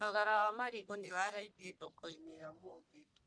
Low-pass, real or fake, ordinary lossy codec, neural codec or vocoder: 9.9 kHz; fake; none; codec, 44.1 kHz, 1.7 kbps, Pupu-Codec